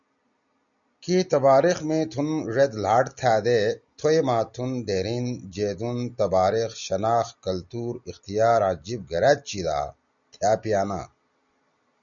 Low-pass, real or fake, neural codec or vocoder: 7.2 kHz; real; none